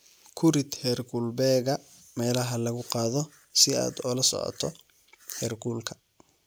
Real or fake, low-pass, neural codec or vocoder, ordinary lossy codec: real; none; none; none